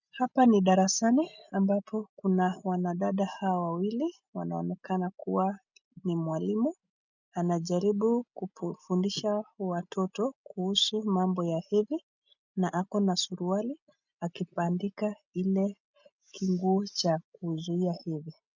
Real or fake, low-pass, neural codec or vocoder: real; 7.2 kHz; none